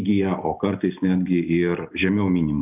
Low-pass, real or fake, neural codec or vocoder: 3.6 kHz; real; none